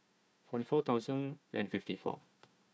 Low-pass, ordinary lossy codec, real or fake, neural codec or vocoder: none; none; fake; codec, 16 kHz, 1 kbps, FunCodec, trained on Chinese and English, 50 frames a second